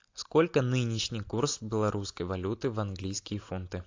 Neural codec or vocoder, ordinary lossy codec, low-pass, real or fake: none; AAC, 48 kbps; 7.2 kHz; real